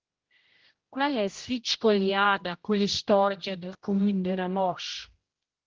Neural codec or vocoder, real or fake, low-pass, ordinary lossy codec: codec, 16 kHz, 0.5 kbps, X-Codec, HuBERT features, trained on general audio; fake; 7.2 kHz; Opus, 16 kbps